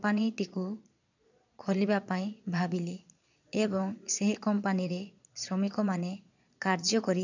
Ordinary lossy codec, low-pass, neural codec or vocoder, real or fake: none; 7.2 kHz; vocoder, 22.05 kHz, 80 mel bands, Vocos; fake